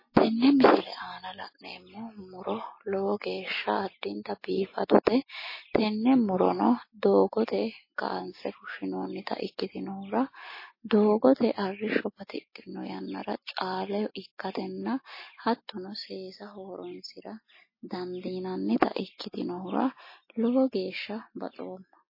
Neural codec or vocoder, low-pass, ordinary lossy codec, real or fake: none; 5.4 kHz; MP3, 24 kbps; real